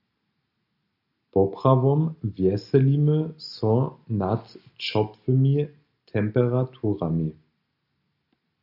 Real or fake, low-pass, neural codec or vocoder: real; 5.4 kHz; none